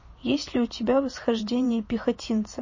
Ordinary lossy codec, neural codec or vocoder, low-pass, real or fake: MP3, 32 kbps; vocoder, 24 kHz, 100 mel bands, Vocos; 7.2 kHz; fake